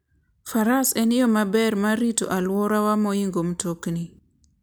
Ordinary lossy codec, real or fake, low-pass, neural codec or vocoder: none; real; none; none